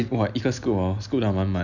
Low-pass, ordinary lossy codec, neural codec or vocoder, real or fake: 7.2 kHz; none; none; real